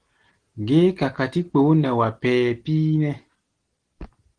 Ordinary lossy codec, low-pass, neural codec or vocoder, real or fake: Opus, 16 kbps; 9.9 kHz; none; real